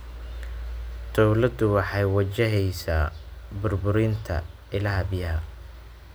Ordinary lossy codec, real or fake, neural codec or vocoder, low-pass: none; real; none; none